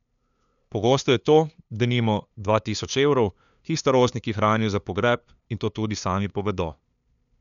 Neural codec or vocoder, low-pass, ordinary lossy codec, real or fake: codec, 16 kHz, 2 kbps, FunCodec, trained on LibriTTS, 25 frames a second; 7.2 kHz; none; fake